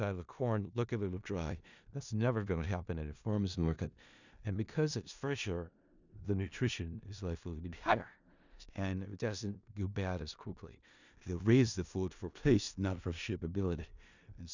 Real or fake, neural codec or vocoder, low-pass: fake; codec, 16 kHz in and 24 kHz out, 0.4 kbps, LongCat-Audio-Codec, four codebook decoder; 7.2 kHz